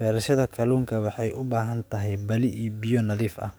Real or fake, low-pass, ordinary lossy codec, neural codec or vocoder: fake; none; none; codec, 44.1 kHz, 7.8 kbps, DAC